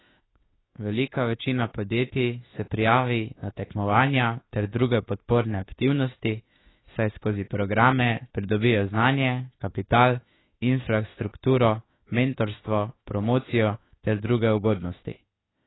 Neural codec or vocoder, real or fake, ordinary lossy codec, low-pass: autoencoder, 48 kHz, 32 numbers a frame, DAC-VAE, trained on Japanese speech; fake; AAC, 16 kbps; 7.2 kHz